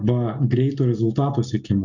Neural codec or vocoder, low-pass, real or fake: none; 7.2 kHz; real